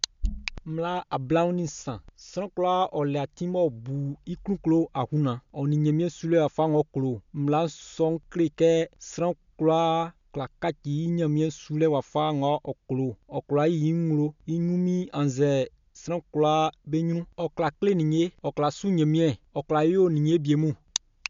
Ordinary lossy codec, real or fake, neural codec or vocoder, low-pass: MP3, 64 kbps; real; none; 7.2 kHz